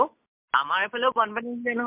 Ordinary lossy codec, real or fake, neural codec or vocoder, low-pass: MP3, 32 kbps; real; none; 3.6 kHz